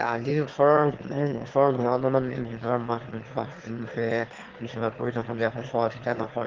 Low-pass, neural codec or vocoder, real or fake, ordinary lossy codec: 7.2 kHz; autoencoder, 22.05 kHz, a latent of 192 numbers a frame, VITS, trained on one speaker; fake; Opus, 16 kbps